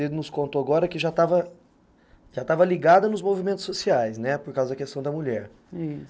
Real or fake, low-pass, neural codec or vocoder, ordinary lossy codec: real; none; none; none